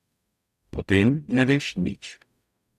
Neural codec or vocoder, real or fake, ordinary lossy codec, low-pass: codec, 44.1 kHz, 0.9 kbps, DAC; fake; none; 14.4 kHz